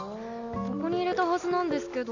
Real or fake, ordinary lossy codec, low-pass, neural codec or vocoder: real; none; 7.2 kHz; none